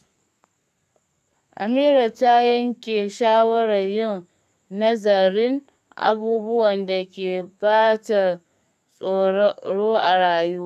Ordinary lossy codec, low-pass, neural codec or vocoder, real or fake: none; 14.4 kHz; codec, 32 kHz, 1.9 kbps, SNAC; fake